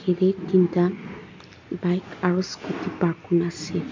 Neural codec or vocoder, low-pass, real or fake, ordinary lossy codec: none; 7.2 kHz; real; MP3, 48 kbps